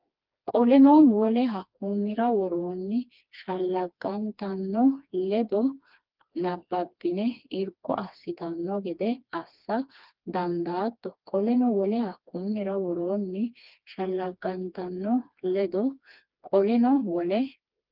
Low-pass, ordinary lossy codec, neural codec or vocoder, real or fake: 5.4 kHz; Opus, 24 kbps; codec, 16 kHz, 2 kbps, FreqCodec, smaller model; fake